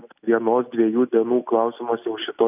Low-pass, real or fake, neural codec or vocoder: 3.6 kHz; real; none